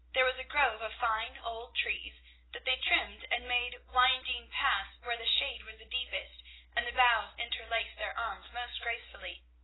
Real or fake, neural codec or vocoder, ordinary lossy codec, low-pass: real; none; AAC, 16 kbps; 7.2 kHz